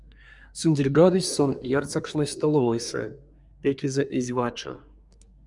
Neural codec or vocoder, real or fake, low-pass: codec, 24 kHz, 1 kbps, SNAC; fake; 10.8 kHz